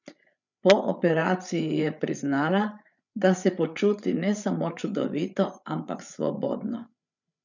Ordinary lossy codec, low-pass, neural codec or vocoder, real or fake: none; 7.2 kHz; codec, 16 kHz, 8 kbps, FreqCodec, larger model; fake